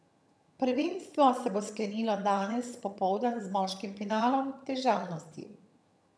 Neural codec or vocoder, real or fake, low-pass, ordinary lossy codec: vocoder, 22.05 kHz, 80 mel bands, HiFi-GAN; fake; none; none